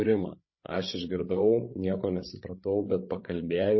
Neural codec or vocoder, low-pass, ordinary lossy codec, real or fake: codec, 16 kHz, 16 kbps, FreqCodec, larger model; 7.2 kHz; MP3, 24 kbps; fake